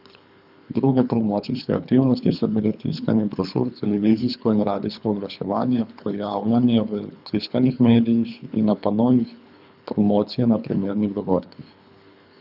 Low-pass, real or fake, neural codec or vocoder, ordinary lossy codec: 5.4 kHz; fake; codec, 24 kHz, 3 kbps, HILCodec; none